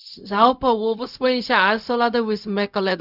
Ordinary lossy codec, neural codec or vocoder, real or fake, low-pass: none; codec, 16 kHz, 0.4 kbps, LongCat-Audio-Codec; fake; 5.4 kHz